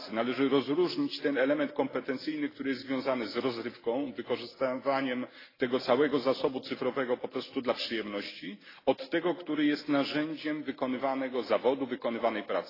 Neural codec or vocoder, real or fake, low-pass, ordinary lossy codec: none; real; 5.4 kHz; AAC, 24 kbps